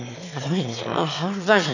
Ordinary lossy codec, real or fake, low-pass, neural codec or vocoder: none; fake; 7.2 kHz; autoencoder, 22.05 kHz, a latent of 192 numbers a frame, VITS, trained on one speaker